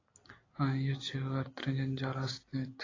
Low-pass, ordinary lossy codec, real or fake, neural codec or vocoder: 7.2 kHz; AAC, 32 kbps; real; none